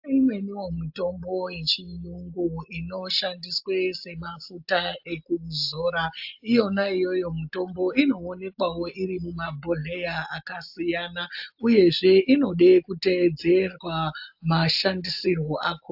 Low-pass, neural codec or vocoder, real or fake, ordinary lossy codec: 5.4 kHz; none; real; AAC, 48 kbps